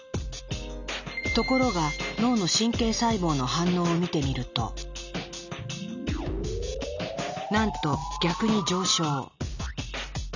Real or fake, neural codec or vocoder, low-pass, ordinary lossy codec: real; none; 7.2 kHz; none